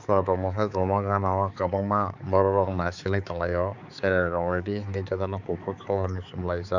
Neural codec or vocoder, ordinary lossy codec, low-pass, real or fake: codec, 16 kHz, 4 kbps, X-Codec, HuBERT features, trained on balanced general audio; none; 7.2 kHz; fake